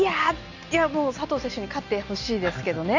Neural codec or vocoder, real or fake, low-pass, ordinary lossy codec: none; real; 7.2 kHz; none